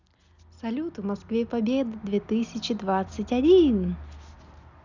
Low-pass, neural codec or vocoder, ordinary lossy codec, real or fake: 7.2 kHz; none; none; real